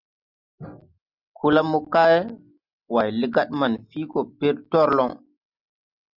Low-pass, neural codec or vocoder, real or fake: 5.4 kHz; none; real